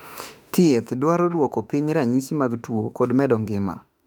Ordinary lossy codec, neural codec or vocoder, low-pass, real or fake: none; autoencoder, 48 kHz, 32 numbers a frame, DAC-VAE, trained on Japanese speech; 19.8 kHz; fake